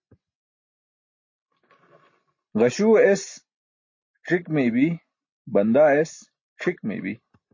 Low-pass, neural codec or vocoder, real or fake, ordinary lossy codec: 7.2 kHz; none; real; MP3, 32 kbps